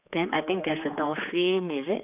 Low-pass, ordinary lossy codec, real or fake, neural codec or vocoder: 3.6 kHz; none; fake; codec, 16 kHz, 4 kbps, X-Codec, HuBERT features, trained on general audio